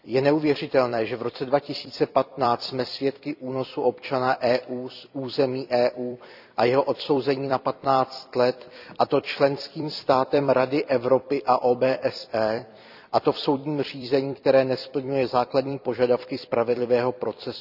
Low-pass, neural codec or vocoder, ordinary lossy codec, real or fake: 5.4 kHz; vocoder, 44.1 kHz, 128 mel bands every 256 samples, BigVGAN v2; none; fake